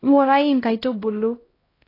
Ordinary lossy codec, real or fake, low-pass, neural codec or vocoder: AAC, 24 kbps; fake; 5.4 kHz; codec, 16 kHz, 0.5 kbps, X-Codec, HuBERT features, trained on LibriSpeech